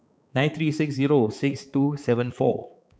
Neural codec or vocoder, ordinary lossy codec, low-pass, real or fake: codec, 16 kHz, 4 kbps, X-Codec, HuBERT features, trained on balanced general audio; none; none; fake